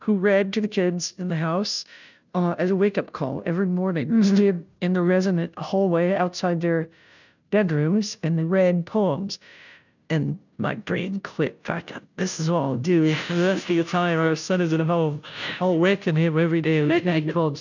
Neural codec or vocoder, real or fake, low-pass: codec, 16 kHz, 0.5 kbps, FunCodec, trained on Chinese and English, 25 frames a second; fake; 7.2 kHz